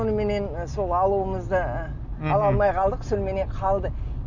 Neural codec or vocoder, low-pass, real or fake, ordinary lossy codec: none; 7.2 kHz; real; none